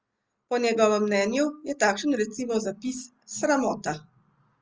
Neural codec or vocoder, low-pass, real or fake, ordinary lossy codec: none; 7.2 kHz; real; Opus, 24 kbps